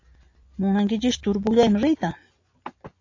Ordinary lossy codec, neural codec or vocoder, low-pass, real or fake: AAC, 48 kbps; none; 7.2 kHz; real